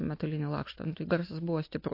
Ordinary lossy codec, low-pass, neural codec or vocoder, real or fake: MP3, 32 kbps; 5.4 kHz; none; real